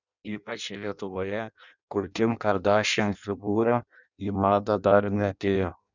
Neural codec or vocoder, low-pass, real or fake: codec, 16 kHz in and 24 kHz out, 0.6 kbps, FireRedTTS-2 codec; 7.2 kHz; fake